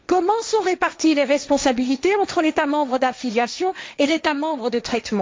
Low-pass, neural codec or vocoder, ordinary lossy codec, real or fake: none; codec, 16 kHz, 1.1 kbps, Voila-Tokenizer; none; fake